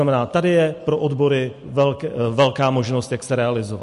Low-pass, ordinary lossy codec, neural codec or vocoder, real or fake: 14.4 kHz; MP3, 48 kbps; none; real